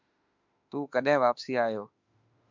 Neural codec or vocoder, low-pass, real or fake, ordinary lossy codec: autoencoder, 48 kHz, 32 numbers a frame, DAC-VAE, trained on Japanese speech; 7.2 kHz; fake; MP3, 64 kbps